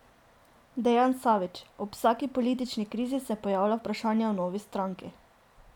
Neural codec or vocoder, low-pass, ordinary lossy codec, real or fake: none; 19.8 kHz; none; real